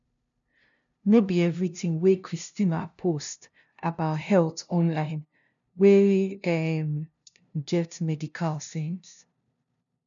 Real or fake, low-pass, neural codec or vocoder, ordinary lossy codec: fake; 7.2 kHz; codec, 16 kHz, 0.5 kbps, FunCodec, trained on LibriTTS, 25 frames a second; none